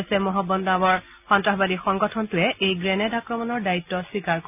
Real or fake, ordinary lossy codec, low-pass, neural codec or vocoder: real; none; 3.6 kHz; none